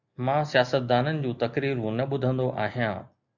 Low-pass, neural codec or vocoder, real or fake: 7.2 kHz; none; real